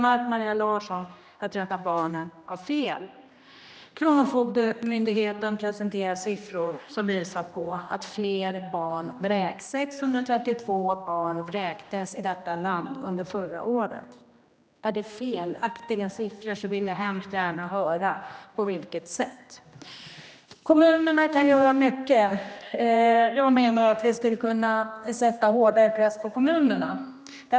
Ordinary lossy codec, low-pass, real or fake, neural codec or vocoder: none; none; fake; codec, 16 kHz, 1 kbps, X-Codec, HuBERT features, trained on general audio